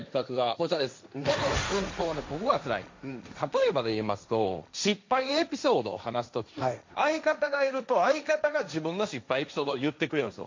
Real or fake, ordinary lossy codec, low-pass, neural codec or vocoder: fake; MP3, 64 kbps; 7.2 kHz; codec, 16 kHz, 1.1 kbps, Voila-Tokenizer